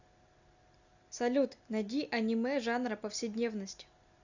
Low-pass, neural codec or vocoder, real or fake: 7.2 kHz; none; real